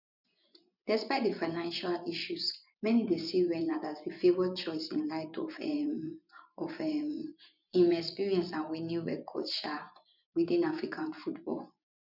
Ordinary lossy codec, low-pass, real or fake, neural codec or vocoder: none; 5.4 kHz; fake; vocoder, 24 kHz, 100 mel bands, Vocos